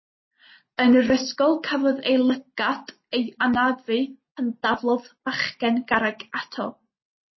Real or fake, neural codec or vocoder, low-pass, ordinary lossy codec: real; none; 7.2 kHz; MP3, 24 kbps